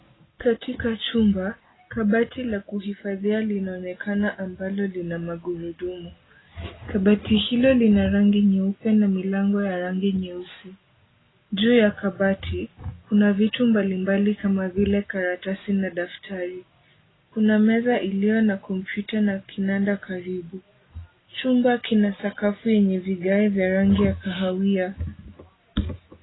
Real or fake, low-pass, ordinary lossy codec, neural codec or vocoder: real; 7.2 kHz; AAC, 16 kbps; none